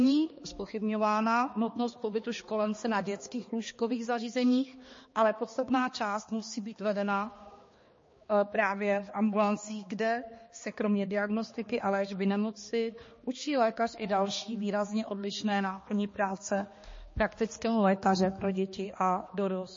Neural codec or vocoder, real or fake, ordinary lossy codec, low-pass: codec, 16 kHz, 2 kbps, X-Codec, HuBERT features, trained on balanced general audio; fake; MP3, 32 kbps; 7.2 kHz